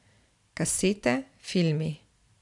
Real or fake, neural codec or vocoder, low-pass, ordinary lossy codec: real; none; 10.8 kHz; none